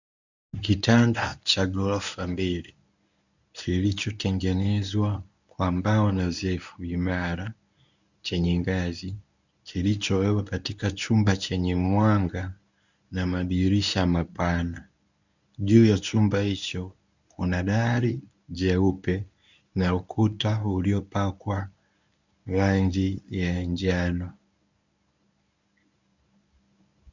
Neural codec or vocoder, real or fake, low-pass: codec, 24 kHz, 0.9 kbps, WavTokenizer, medium speech release version 1; fake; 7.2 kHz